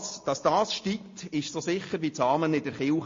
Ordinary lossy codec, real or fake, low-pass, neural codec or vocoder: MP3, 32 kbps; real; 7.2 kHz; none